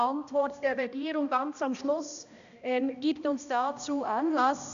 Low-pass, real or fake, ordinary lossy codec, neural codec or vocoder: 7.2 kHz; fake; none; codec, 16 kHz, 1 kbps, X-Codec, HuBERT features, trained on balanced general audio